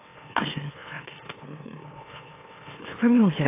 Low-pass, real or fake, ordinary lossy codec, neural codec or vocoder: 3.6 kHz; fake; AAC, 24 kbps; autoencoder, 44.1 kHz, a latent of 192 numbers a frame, MeloTTS